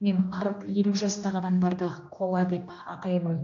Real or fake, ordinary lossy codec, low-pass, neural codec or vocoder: fake; AAC, 48 kbps; 7.2 kHz; codec, 16 kHz, 1 kbps, X-Codec, HuBERT features, trained on general audio